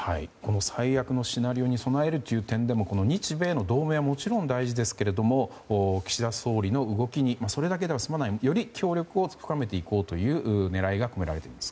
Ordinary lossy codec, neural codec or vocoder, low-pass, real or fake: none; none; none; real